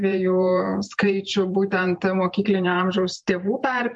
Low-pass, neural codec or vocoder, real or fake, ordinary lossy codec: 10.8 kHz; vocoder, 44.1 kHz, 128 mel bands every 512 samples, BigVGAN v2; fake; MP3, 48 kbps